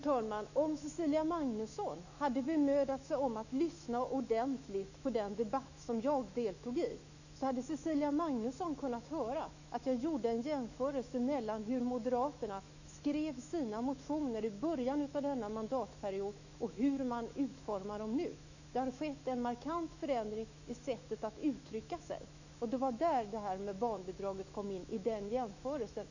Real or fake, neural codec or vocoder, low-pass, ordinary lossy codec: fake; autoencoder, 48 kHz, 128 numbers a frame, DAC-VAE, trained on Japanese speech; 7.2 kHz; none